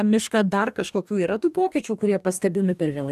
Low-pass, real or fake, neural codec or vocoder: 14.4 kHz; fake; codec, 44.1 kHz, 2.6 kbps, DAC